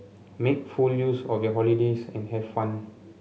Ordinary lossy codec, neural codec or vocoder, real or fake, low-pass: none; none; real; none